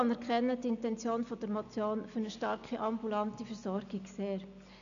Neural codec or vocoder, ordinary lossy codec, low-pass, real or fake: none; none; 7.2 kHz; real